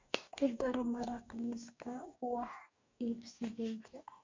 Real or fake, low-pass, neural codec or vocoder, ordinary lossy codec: fake; 7.2 kHz; codec, 44.1 kHz, 2.6 kbps, DAC; MP3, 64 kbps